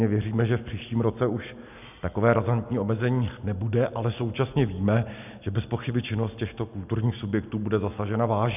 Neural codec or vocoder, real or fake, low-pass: none; real; 3.6 kHz